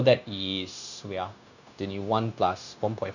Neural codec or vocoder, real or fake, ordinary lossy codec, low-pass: codec, 16 kHz, 0.9 kbps, LongCat-Audio-Codec; fake; none; 7.2 kHz